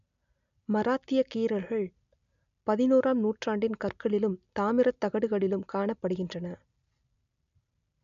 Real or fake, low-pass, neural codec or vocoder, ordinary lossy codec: real; 7.2 kHz; none; none